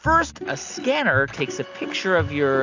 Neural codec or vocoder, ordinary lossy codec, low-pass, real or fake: none; AAC, 48 kbps; 7.2 kHz; real